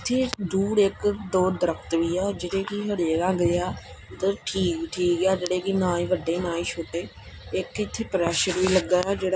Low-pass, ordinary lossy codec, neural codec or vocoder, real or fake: none; none; none; real